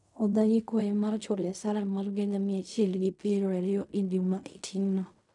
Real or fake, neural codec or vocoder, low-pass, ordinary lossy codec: fake; codec, 16 kHz in and 24 kHz out, 0.4 kbps, LongCat-Audio-Codec, fine tuned four codebook decoder; 10.8 kHz; none